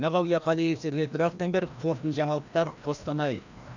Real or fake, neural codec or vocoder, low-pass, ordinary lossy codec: fake; codec, 16 kHz, 1 kbps, FreqCodec, larger model; 7.2 kHz; none